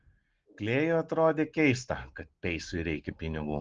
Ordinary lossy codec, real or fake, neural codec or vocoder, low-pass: Opus, 16 kbps; real; none; 7.2 kHz